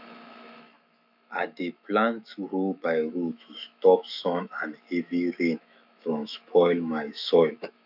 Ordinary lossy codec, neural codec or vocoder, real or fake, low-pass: none; autoencoder, 48 kHz, 128 numbers a frame, DAC-VAE, trained on Japanese speech; fake; 5.4 kHz